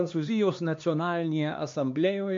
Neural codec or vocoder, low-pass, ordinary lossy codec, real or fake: codec, 16 kHz, 2 kbps, X-Codec, HuBERT features, trained on LibriSpeech; 7.2 kHz; MP3, 48 kbps; fake